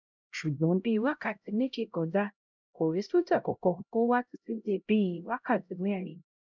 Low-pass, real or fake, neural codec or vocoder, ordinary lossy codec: 7.2 kHz; fake; codec, 16 kHz, 0.5 kbps, X-Codec, HuBERT features, trained on LibriSpeech; none